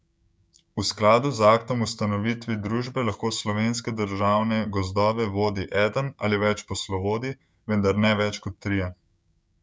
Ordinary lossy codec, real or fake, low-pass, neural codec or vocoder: none; fake; none; codec, 16 kHz, 6 kbps, DAC